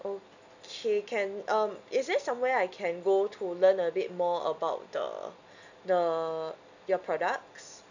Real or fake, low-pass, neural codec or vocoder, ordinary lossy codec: real; 7.2 kHz; none; none